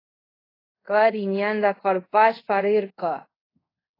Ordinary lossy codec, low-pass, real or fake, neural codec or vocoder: AAC, 24 kbps; 5.4 kHz; fake; codec, 24 kHz, 0.5 kbps, DualCodec